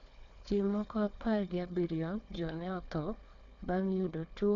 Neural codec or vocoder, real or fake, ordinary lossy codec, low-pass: codec, 16 kHz, 4 kbps, FunCodec, trained on Chinese and English, 50 frames a second; fake; none; 7.2 kHz